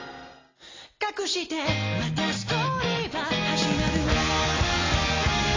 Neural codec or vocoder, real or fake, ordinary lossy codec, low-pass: none; real; AAC, 32 kbps; 7.2 kHz